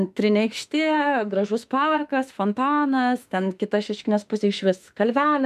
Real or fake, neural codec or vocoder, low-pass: fake; autoencoder, 48 kHz, 32 numbers a frame, DAC-VAE, trained on Japanese speech; 14.4 kHz